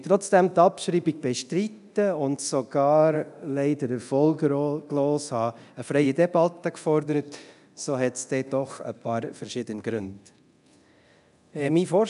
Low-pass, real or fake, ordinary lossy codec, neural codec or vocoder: 10.8 kHz; fake; none; codec, 24 kHz, 0.9 kbps, DualCodec